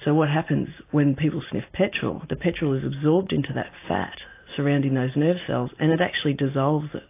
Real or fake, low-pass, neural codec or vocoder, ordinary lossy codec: real; 3.6 kHz; none; AAC, 24 kbps